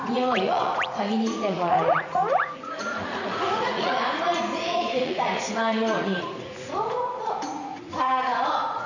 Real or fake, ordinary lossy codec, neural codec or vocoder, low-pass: fake; none; vocoder, 44.1 kHz, 80 mel bands, Vocos; 7.2 kHz